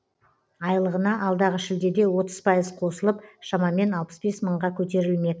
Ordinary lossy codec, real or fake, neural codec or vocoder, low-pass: none; real; none; none